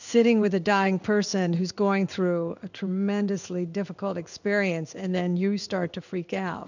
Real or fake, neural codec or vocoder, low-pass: fake; codec, 16 kHz in and 24 kHz out, 1 kbps, XY-Tokenizer; 7.2 kHz